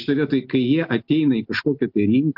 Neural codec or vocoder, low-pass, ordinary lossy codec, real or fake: none; 5.4 kHz; AAC, 48 kbps; real